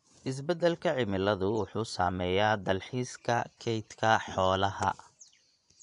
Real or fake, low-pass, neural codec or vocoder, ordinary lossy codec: fake; 10.8 kHz; vocoder, 24 kHz, 100 mel bands, Vocos; none